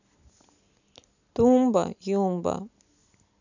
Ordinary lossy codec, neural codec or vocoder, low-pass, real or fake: none; none; 7.2 kHz; real